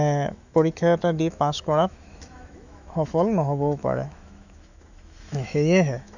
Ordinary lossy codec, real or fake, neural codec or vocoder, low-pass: none; fake; autoencoder, 48 kHz, 128 numbers a frame, DAC-VAE, trained on Japanese speech; 7.2 kHz